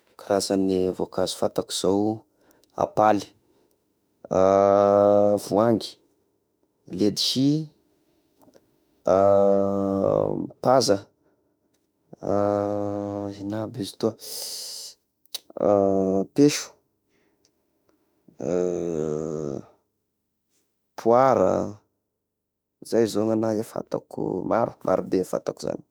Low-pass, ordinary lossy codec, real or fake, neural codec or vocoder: none; none; fake; autoencoder, 48 kHz, 32 numbers a frame, DAC-VAE, trained on Japanese speech